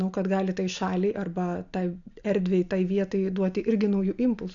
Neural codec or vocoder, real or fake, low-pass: none; real; 7.2 kHz